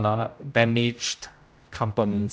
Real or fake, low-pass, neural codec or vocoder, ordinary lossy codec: fake; none; codec, 16 kHz, 0.5 kbps, X-Codec, HuBERT features, trained on general audio; none